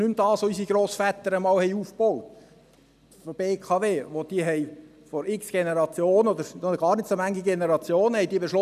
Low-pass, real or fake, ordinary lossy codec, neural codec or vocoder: 14.4 kHz; real; none; none